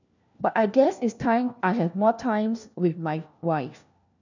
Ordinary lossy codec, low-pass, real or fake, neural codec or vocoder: none; 7.2 kHz; fake; codec, 16 kHz, 1 kbps, FunCodec, trained on LibriTTS, 50 frames a second